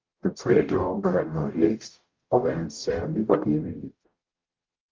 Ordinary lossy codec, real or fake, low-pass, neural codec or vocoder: Opus, 32 kbps; fake; 7.2 kHz; codec, 44.1 kHz, 0.9 kbps, DAC